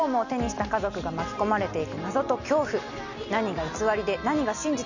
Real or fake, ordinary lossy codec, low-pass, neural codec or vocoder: real; none; 7.2 kHz; none